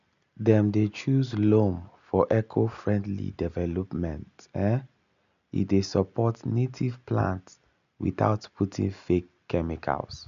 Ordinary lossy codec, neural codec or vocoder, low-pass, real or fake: MP3, 96 kbps; none; 7.2 kHz; real